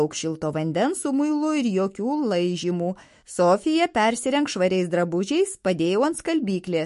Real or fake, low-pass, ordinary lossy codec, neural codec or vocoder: fake; 14.4 kHz; MP3, 48 kbps; autoencoder, 48 kHz, 128 numbers a frame, DAC-VAE, trained on Japanese speech